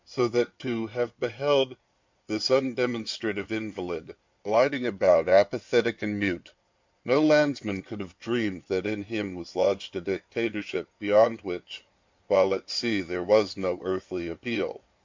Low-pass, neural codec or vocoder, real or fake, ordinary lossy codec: 7.2 kHz; codec, 16 kHz in and 24 kHz out, 2.2 kbps, FireRedTTS-2 codec; fake; MP3, 64 kbps